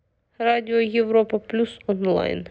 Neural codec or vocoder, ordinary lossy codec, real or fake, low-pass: none; none; real; none